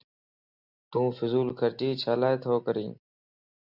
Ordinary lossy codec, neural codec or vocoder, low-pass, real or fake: Opus, 64 kbps; none; 5.4 kHz; real